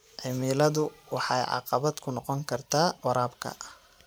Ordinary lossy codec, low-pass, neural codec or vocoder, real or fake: none; none; vocoder, 44.1 kHz, 128 mel bands every 512 samples, BigVGAN v2; fake